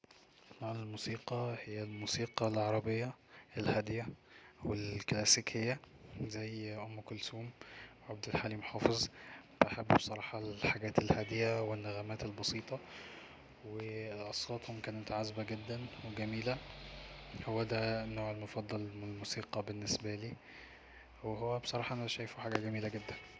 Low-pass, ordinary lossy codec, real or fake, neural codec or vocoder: none; none; real; none